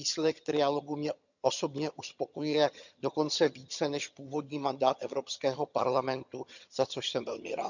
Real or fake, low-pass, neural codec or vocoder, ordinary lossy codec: fake; 7.2 kHz; vocoder, 22.05 kHz, 80 mel bands, HiFi-GAN; none